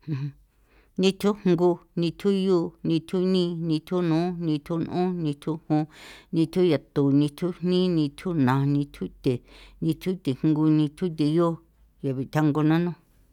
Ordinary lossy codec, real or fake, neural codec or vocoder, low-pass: none; real; none; 19.8 kHz